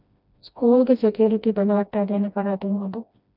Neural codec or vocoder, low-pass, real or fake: codec, 16 kHz, 1 kbps, FreqCodec, smaller model; 5.4 kHz; fake